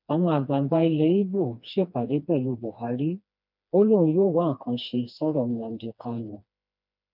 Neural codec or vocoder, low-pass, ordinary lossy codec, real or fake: codec, 16 kHz, 2 kbps, FreqCodec, smaller model; 5.4 kHz; none; fake